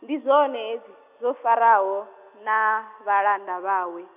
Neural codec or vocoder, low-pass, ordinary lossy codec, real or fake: none; 3.6 kHz; none; real